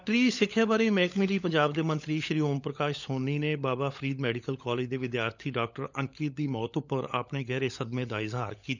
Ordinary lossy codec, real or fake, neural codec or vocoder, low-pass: none; fake; codec, 16 kHz, 16 kbps, FunCodec, trained on LibriTTS, 50 frames a second; 7.2 kHz